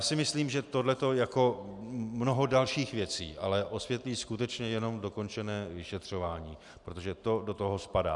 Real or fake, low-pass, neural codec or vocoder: real; 10.8 kHz; none